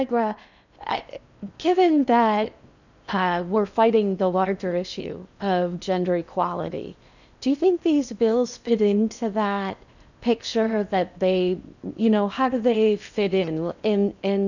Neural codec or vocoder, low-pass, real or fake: codec, 16 kHz in and 24 kHz out, 0.8 kbps, FocalCodec, streaming, 65536 codes; 7.2 kHz; fake